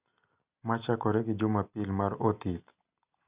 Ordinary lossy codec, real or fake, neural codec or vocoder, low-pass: Opus, 64 kbps; real; none; 3.6 kHz